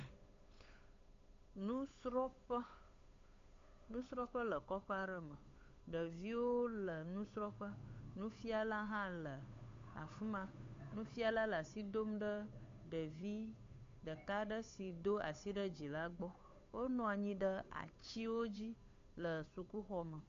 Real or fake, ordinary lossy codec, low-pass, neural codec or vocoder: fake; AAC, 48 kbps; 7.2 kHz; codec, 16 kHz, 8 kbps, FunCodec, trained on Chinese and English, 25 frames a second